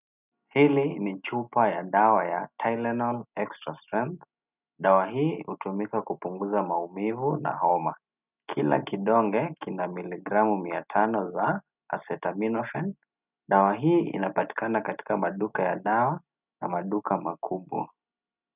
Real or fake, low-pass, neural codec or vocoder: real; 3.6 kHz; none